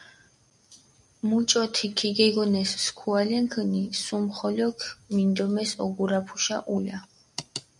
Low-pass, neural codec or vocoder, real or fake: 10.8 kHz; none; real